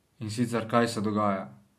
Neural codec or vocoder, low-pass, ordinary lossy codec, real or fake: none; 14.4 kHz; MP3, 64 kbps; real